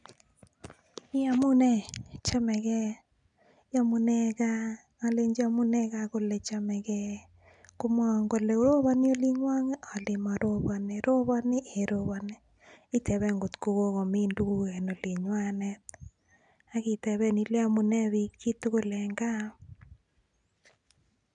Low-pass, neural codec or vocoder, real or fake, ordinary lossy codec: 9.9 kHz; none; real; none